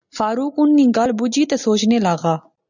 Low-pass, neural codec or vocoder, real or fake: 7.2 kHz; none; real